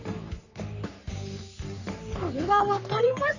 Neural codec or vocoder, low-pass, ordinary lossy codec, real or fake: codec, 44.1 kHz, 3.4 kbps, Pupu-Codec; 7.2 kHz; none; fake